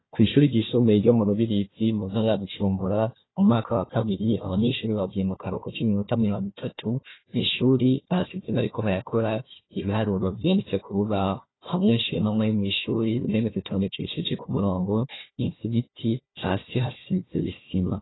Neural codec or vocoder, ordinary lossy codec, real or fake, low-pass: codec, 16 kHz, 1 kbps, FunCodec, trained on Chinese and English, 50 frames a second; AAC, 16 kbps; fake; 7.2 kHz